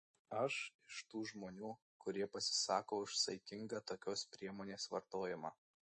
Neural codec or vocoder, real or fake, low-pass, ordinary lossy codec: none; real; 10.8 kHz; MP3, 32 kbps